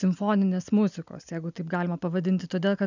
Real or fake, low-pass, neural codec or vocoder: real; 7.2 kHz; none